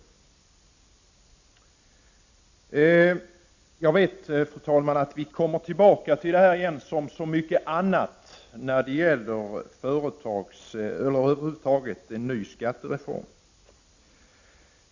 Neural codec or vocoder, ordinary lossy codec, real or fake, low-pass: none; Opus, 64 kbps; real; 7.2 kHz